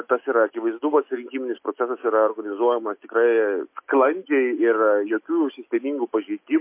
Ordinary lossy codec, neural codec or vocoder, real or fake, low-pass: MP3, 24 kbps; none; real; 3.6 kHz